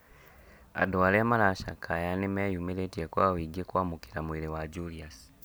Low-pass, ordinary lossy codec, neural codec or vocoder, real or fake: none; none; none; real